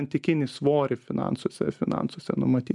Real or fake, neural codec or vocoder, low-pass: real; none; 10.8 kHz